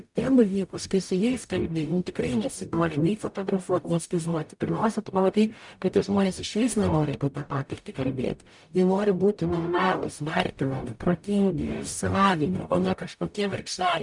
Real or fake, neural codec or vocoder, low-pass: fake; codec, 44.1 kHz, 0.9 kbps, DAC; 10.8 kHz